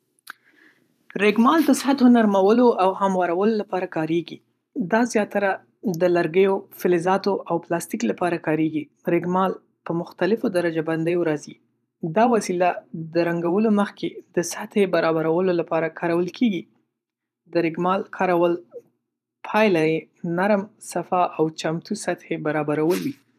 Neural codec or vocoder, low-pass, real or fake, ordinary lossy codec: vocoder, 44.1 kHz, 128 mel bands every 512 samples, BigVGAN v2; 14.4 kHz; fake; none